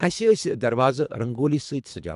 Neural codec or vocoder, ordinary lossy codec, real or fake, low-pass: codec, 24 kHz, 3 kbps, HILCodec; none; fake; 10.8 kHz